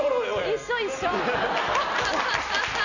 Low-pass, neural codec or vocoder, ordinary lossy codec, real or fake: 7.2 kHz; none; none; real